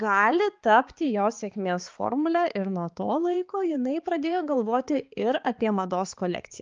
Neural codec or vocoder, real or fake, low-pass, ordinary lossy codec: codec, 16 kHz, 4 kbps, X-Codec, HuBERT features, trained on balanced general audio; fake; 7.2 kHz; Opus, 24 kbps